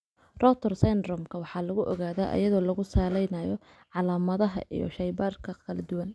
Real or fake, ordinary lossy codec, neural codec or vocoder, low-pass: real; none; none; none